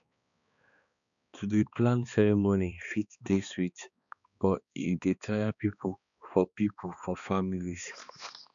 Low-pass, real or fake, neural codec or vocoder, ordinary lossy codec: 7.2 kHz; fake; codec, 16 kHz, 2 kbps, X-Codec, HuBERT features, trained on balanced general audio; MP3, 96 kbps